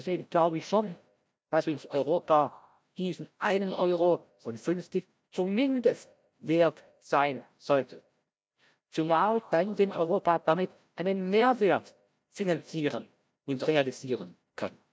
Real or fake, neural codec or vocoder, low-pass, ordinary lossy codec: fake; codec, 16 kHz, 0.5 kbps, FreqCodec, larger model; none; none